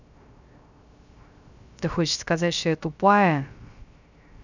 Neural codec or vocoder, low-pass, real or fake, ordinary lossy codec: codec, 16 kHz, 0.3 kbps, FocalCodec; 7.2 kHz; fake; none